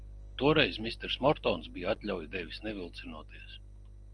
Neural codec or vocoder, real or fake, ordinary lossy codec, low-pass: none; real; Opus, 32 kbps; 9.9 kHz